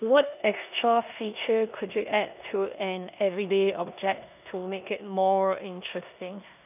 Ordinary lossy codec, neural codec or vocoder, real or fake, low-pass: none; codec, 16 kHz in and 24 kHz out, 0.9 kbps, LongCat-Audio-Codec, four codebook decoder; fake; 3.6 kHz